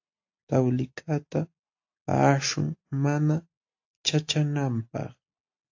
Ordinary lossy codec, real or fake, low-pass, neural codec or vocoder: AAC, 48 kbps; real; 7.2 kHz; none